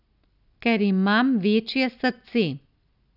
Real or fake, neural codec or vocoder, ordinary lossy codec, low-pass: real; none; none; 5.4 kHz